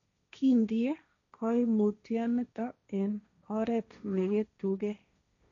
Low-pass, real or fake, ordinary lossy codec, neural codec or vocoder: 7.2 kHz; fake; none; codec, 16 kHz, 1.1 kbps, Voila-Tokenizer